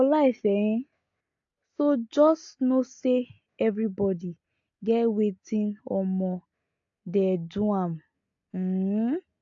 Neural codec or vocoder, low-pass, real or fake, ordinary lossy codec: none; 7.2 kHz; real; AAC, 48 kbps